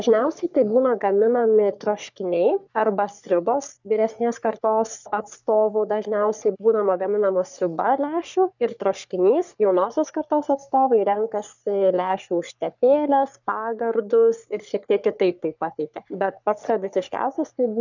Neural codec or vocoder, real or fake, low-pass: codec, 44.1 kHz, 3.4 kbps, Pupu-Codec; fake; 7.2 kHz